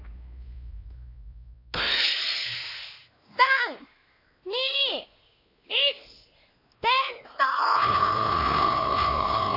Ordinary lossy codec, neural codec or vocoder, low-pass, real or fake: AAC, 32 kbps; codec, 16 kHz, 2 kbps, X-Codec, WavLM features, trained on Multilingual LibriSpeech; 5.4 kHz; fake